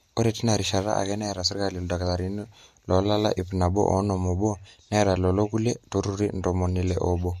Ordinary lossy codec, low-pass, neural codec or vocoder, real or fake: MP3, 64 kbps; 14.4 kHz; none; real